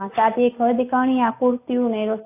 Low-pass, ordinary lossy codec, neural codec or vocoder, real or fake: 3.6 kHz; AAC, 24 kbps; none; real